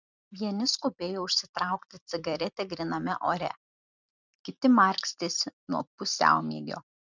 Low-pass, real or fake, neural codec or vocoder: 7.2 kHz; fake; vocoder, 44.1 kHz, 128 mel bands every 256 samples, BigVGAN v2